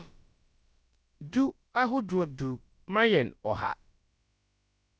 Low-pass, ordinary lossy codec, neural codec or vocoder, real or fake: none; none; codec, 16 kHz, about 1 kbps, DyCAST, with the encoder's durations; fake